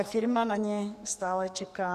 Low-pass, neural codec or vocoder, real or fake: 14.4 kHz; codec, 44.1 kHz, 2.6 kbps, SNAC; fake